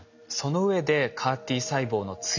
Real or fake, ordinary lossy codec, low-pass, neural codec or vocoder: real; none; 7.2 kHz; none